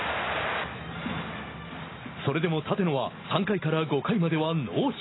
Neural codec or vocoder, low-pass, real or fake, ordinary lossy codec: none; 7.2 kHz; real; AAC, 16 kbps